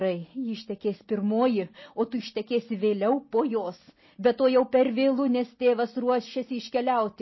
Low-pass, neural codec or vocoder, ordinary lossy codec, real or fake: 7.2 kHz; none; MP3, 24 kbps; real